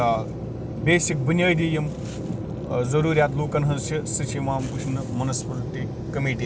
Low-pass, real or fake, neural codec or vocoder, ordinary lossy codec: none; real; none; none